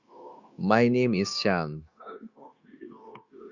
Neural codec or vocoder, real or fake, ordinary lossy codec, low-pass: codec, 16 kHz, 0.9 kbps, LongCat-Audio-Codec; fake; Opus, 64 kbps; 7.2 kHz